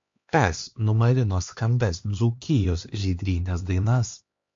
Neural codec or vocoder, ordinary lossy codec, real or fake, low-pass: codec, 16 kHz, 2 kbps, X-Codec, HuBERT features, trained on LibriSpeech; MP3, 48 kbps; fake; 7.2 kHz